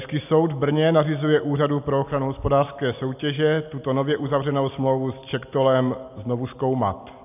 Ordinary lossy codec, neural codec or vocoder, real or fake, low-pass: AAC, 32 kbps; none; real; 3.6 kHz